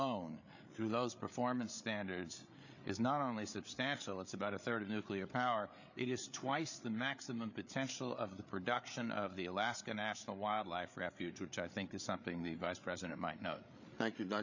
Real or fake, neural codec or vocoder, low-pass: fake; codec, 16 kHz, 8 kbps, FreqCodec, larger model; 7.2 kHz